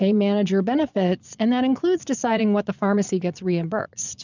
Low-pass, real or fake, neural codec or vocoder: 7.2 kHz; fake; vocoder, 22.05 kHz, 80 mel bands, Vocos